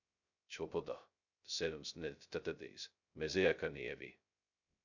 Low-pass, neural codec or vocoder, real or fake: 7.2 kHz; codec, 16 kHz, 0.2 kbps, FocalCodec; fake